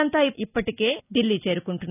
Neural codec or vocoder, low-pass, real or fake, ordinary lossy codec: none; 3.6 kHz; real; none